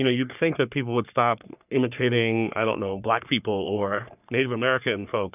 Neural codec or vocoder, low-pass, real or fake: codec, 44.1 kHz, 3.4 kbps, Pupu-Codec; 3.6 kHz; fake